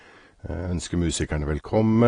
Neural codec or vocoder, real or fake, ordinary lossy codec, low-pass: none; real; AAC, 32 kbps; 9.9 kHz